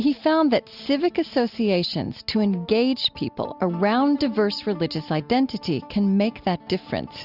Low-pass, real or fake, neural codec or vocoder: 5.4 kHz; real; none